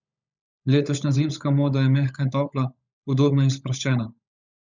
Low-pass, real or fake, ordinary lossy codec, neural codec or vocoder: 7.2 kHz; fake; none; codec, 16 kHz, 16 kbps, FunCodec, trained on LibriTTS, 50 frames a second